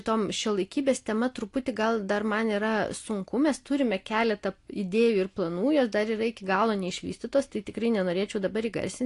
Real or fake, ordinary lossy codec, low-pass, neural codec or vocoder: real; AAC, 48 kbps; 10.8 kHz; none